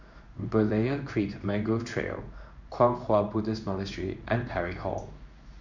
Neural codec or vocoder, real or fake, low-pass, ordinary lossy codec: codec, 16 kHz in and 24 kHz out, 1 kbps, XY-Tokenizer; fake; 7.2 kHz; none